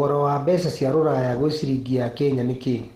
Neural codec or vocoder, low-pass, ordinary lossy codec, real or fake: none; 14.4 kHz; Opus, 16 kbps; real